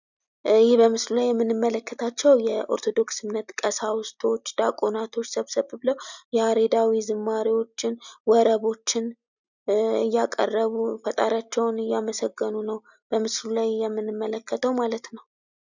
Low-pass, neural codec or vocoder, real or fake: 7.2 kHz; none; real